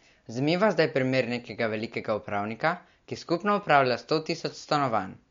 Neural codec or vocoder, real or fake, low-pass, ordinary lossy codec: none; real; 7.2 kHz; MP3, 48 kbps